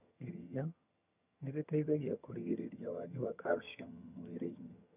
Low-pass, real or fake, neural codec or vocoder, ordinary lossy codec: 3.6 kHz; fake; vocoder, 22.05 kHz, 80 mel bands, HiFi-GAN; none